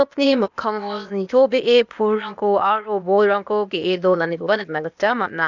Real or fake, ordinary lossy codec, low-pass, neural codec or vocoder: fake; none; 7.2 kHz; codec, 16 kHz, 0.8 kbps, ZipCodec